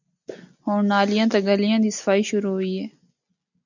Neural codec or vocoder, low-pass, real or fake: none; 7.2 kHz; real